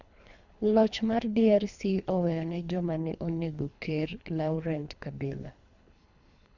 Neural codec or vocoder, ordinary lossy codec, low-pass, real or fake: codec, 24 kHz, 3 kbps, HILCodec; none; 7.2 kHz; fake